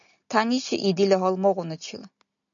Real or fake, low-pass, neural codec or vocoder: real; 7.2 kHz; none